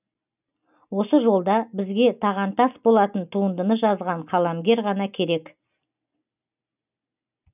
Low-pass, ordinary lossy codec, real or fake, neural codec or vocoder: 3.6 kHz; none; real; none